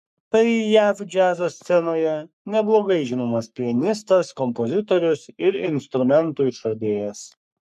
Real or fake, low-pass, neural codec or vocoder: fake; 14.4 kHz; codec, 44.1 kHz, 3.4 kbps, Pupu-Codec